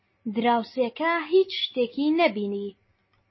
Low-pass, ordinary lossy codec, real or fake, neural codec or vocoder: 7.2 kHz; MP3, 24 kbps; real; none